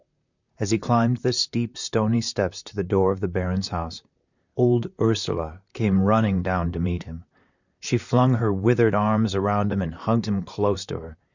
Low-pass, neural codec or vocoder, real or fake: 7.2 kHz; vocoder, 44.1 kHz, 128 mel bands, Pupu-Vocoder; fake